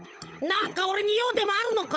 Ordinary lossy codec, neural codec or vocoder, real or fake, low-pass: none; codec, 16 kHz, 16 kbps, FunCodec, trained on LibriTTS, 50 frames a second; fake; none